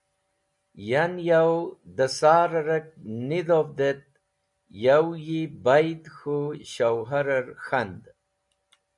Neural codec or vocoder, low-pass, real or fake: none; 10.8 kHz; real